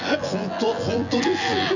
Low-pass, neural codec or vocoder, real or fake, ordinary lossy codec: 7.2 kHz; vocoder, 24 kHz, 100 mel bands, Vocos; fake; none